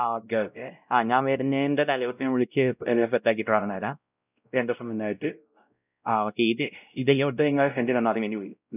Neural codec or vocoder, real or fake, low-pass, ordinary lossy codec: codec, 16 kHz, 0.5 kbps, X-Codec, WavLM features, trained on Multilingual LibriSpeech; fake; 3.6 kHz; none